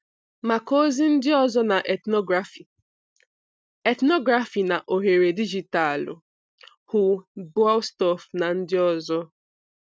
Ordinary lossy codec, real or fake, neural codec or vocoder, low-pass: none; real; none; none